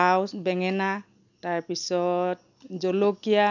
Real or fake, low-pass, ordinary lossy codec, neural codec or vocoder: real; 7.2 kHz; none; none